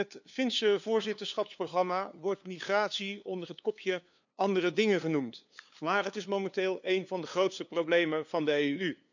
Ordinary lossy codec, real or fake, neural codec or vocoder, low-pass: none; fake; codec, 16 kHz, 2 kbps, FunCodec, trained on LibriTTS, 25 frames a second; 7.2 kHz